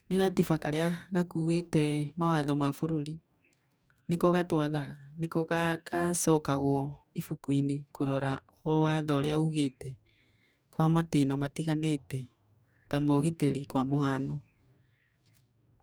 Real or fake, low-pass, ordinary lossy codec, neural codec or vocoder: fake; none; none; codec, 44.1 kHz, 2.6 kbps, DAC